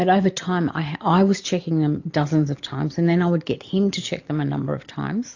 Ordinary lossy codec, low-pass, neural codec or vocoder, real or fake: AAC, 48 kbps; 7.2 kHz; none; real